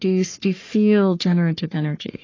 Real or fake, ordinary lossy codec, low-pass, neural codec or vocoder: fake; AAC, 32 kbps; 7.2 kHz; codec, 44.1 kHz, 3.4 kbps, Pupu-Codec